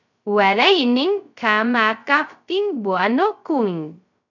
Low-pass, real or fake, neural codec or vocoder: 7.2 kHz; fake; codec, 16 kHz, 0.2 kbps, FocalCodec